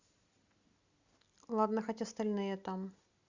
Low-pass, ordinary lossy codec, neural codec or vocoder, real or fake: 7.2 kHz; Opus, 64 kbps; none; real